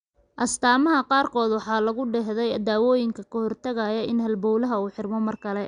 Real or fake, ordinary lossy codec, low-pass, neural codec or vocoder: real; Opus, 64 kbps; 9.9 kHz; none